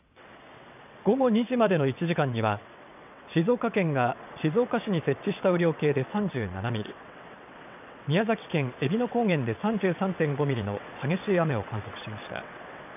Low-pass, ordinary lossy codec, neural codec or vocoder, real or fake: 3.6 kHz; none; vocoder, 22.05 kHz, 80 mel bands, WaveNeXt; fake